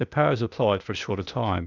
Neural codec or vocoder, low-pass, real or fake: codec, 16 kHz, 0.8 kbps, ZipCodec; 7.2 kHz; fake